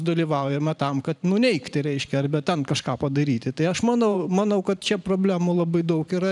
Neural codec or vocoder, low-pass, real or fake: vocoder, 44.1 kHz, 128 mel bands every 512 samples, BigVGAN v2; 10.8 kHz; fake